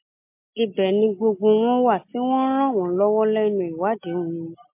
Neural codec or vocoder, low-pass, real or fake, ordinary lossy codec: none; 3.6 kHz; real; MP3, 24 kbps